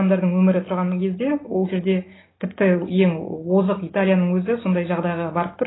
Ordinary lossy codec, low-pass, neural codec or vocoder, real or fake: AAC, 16 kbps; 7.2 kHz; none; real